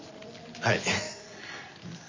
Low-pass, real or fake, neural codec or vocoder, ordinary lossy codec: 7.2 kHz; real; none; MP3, 64 kbps